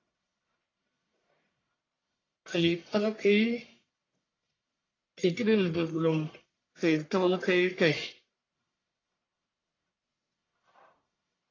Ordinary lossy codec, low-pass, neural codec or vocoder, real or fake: AAC, 32 kbps; 7.2 kHz; codec, 44.1 kHz, 1.7 kbps, Pupu-Codec; fake